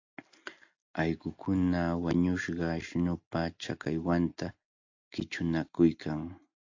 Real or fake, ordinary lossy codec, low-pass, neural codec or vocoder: real; AAC, 48 kbps; 7.2 kHz; none